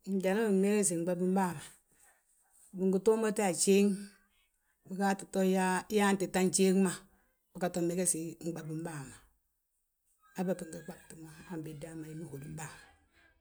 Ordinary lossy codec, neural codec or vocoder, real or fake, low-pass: none; none; real; none